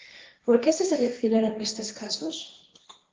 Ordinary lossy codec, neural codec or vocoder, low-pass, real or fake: Opus, 32 kbps; codec, 16 kHz, 1.1 kbps, Voila-Tokenizer; 7.2 kHz; fake